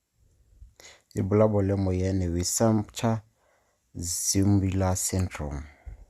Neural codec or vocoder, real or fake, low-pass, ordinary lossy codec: none; real; 14.4 kHz; Opus, 64 kbps